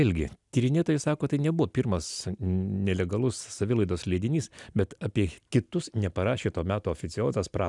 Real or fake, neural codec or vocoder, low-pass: real; none; 10.8 kHz